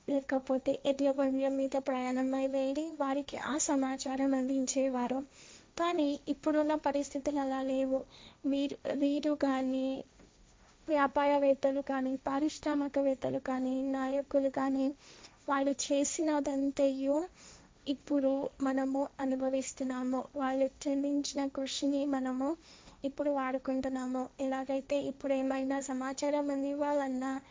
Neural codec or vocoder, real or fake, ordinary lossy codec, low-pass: codec, 16 kHz, 1.1 kbps, Voila-Tokenizer; fake; none; none